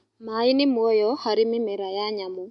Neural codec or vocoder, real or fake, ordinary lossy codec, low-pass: none; real; MP3, 48 kbps; 10.8 kHz